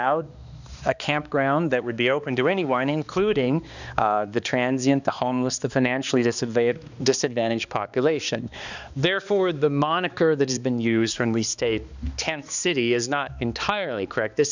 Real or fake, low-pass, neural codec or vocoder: fake; 7.2 kHz; codec, 16 kHz, 2 kbps, X-Codec, HuBERT features, trained on balanced general audio